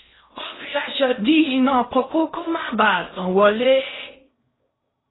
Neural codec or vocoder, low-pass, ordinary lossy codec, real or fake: codec, 16 kHz in and 24 kHz out, 0.6 kbps, FocalCodec, streaming, 4096 codes; 7.2 kHz; AAC, 16 kbps; fake